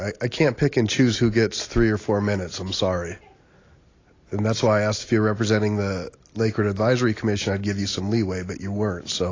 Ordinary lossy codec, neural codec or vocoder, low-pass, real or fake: AAC, 32 kbps; none; 7.2 kHz; real